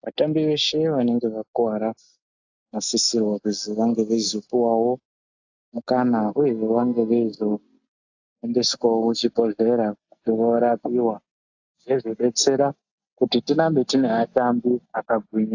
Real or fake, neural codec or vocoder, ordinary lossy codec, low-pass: real; none; AAC, 48 kbps; 7.2 kHz